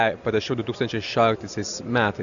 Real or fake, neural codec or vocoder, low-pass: real; none; 7.2 kHz